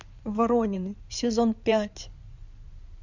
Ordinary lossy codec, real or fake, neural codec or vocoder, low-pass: none; fake; codec, 16 kHz in and 24 kHz out, 2.2 kbps, FireRedTTS-2 codec; 7.2 kHz